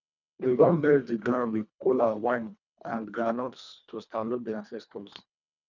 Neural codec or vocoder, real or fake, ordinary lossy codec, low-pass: codec, 24 kHz, 1.5 kbps, HILCodec; fake; none; 7.2 kHz